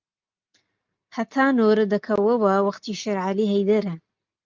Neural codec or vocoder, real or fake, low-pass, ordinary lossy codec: none; real; 7.2 kHz; Opus, 24 kbps